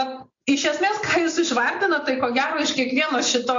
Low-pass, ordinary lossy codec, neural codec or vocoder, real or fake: 7.2 kHz; AAC, 48 kbps; none; real